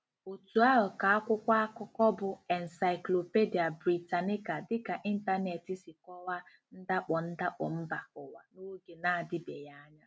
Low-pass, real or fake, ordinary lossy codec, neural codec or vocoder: none; real; none; none